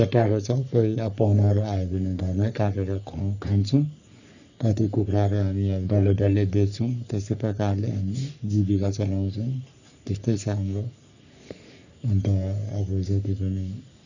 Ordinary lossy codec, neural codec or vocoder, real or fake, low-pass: none; codec, 44.1 kHz, 3.4 kbps, Pupu-Codec; fake; 7.2 kHz